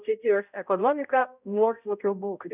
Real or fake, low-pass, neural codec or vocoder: fake; 3.6 kHz; codec, 16 kHz, 0.5 kbps, X-Codec, HuBERT features, trained on balanced general audio